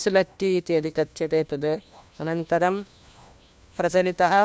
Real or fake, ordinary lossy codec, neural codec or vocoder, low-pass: fake; none; codec, 16 kHz, 1 kbps, FunCodec, trained on LibriTTS, 50 frames a second; none